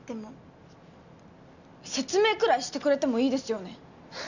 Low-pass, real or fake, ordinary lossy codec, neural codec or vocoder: 7.2 kHz; real; none; none